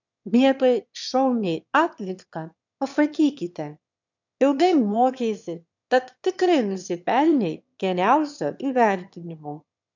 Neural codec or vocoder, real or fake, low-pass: autoencoder, 22.05 kHz, a latent of 192 numbers a frame, VITS, trained on one speaker; fake; 7.2 kHz